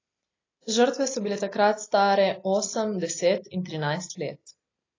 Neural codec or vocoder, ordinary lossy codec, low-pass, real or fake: none; AAC, 32 kbps; 7.2 kHz; real